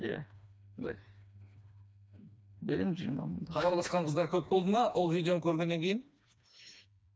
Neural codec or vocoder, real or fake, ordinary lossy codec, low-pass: codec, 16 kHz, 2 kbps, FreqCodec, smaller model; fake; none; none